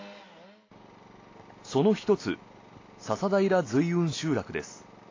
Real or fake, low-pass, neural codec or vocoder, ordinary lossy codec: real; 7.2 kHz; none; AAC, 32 kbps